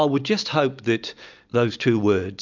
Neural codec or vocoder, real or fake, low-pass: none; real; 7.2 kHz